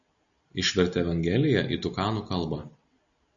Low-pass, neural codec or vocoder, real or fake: 7.2 kHz; none; real